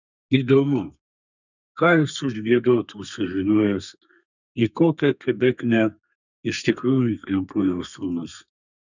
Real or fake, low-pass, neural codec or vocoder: fake; 7.2 kHz; codec, 32 kHz, 1.9 kbps, SNAC